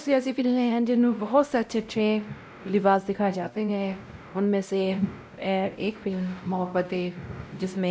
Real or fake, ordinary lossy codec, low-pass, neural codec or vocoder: fake; none; none; codec, 16 kHz, 0.5 kbps, X-Codec, WavLM features, trained on Multilingual LibriSpeech